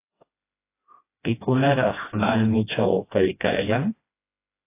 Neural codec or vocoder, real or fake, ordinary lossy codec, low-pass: codec, 16 kHz, 1 kbps, FreqCodec, smaller model; fake; AAC, 24 kbps; 3.6 kHz